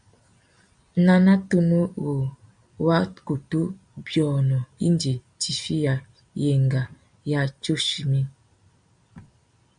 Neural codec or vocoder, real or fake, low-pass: none; real; 9.9 kHz